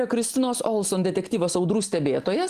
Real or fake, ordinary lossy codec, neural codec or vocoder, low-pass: real; Opus, 24 kbps; none; 14.4 kHz